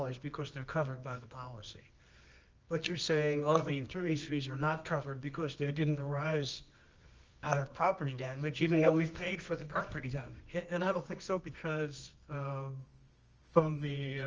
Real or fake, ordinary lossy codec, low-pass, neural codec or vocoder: fake; Opus, 24 kbps; 7.2 kHz; codec, 24 kHz, 0.9 kbps, WavTokenizer, medium music audio release